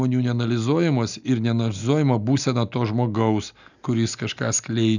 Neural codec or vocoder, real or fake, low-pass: none; real; 7.2 kHz